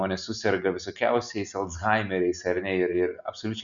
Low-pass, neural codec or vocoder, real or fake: 7.2 kHz; none; real